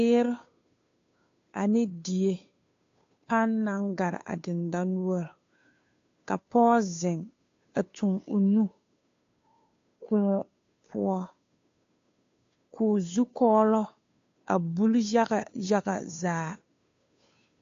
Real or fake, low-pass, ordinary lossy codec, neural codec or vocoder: fake; 7.2 kHz; AAC, 48 kbps; codec, 16 kHz, 2 kbps, FunCodec, trained on Chinese and English, 25 frames a second